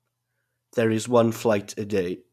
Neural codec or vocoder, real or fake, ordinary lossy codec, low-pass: none; real; none; 14.4 kHz